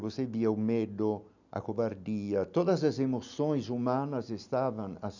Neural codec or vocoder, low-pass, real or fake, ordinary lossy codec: none; 7.2 kHz; real; none